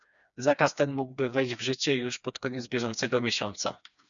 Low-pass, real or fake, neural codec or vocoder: 7.2 kHz; fake; codec, 16 kHz, 2 kbps, FreqCodec, smaller model